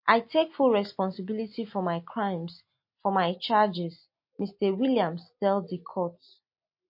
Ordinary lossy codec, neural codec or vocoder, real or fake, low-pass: MP3, 32 kbps; none; real; 5.4 kHz